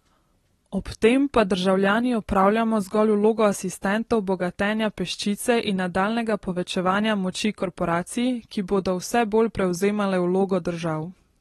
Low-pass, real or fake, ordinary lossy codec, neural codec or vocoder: 14.4 kHz; real; AAC, 32 kbps; none